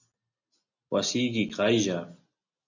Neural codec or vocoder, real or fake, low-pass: none; real; 7.2 kHz